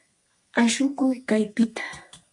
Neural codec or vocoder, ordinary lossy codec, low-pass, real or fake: codec, 32 kHz, 1.9 kbps, SNAC; MP3, 48 kbps; 10.8 kHz; fake